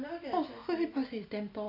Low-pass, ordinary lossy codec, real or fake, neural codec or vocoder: 5.4 kHz; none; real; none